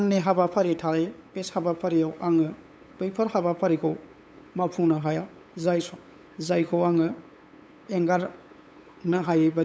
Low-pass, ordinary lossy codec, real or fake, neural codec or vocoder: none; none; fake; codec, 16 kHz, 8 kbps, FunCodec, trained on LibriTTS, 25 frames a second